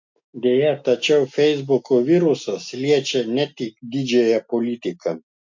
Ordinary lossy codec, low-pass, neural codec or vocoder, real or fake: MP3, 48 kbps; 7.2 kHz; none; real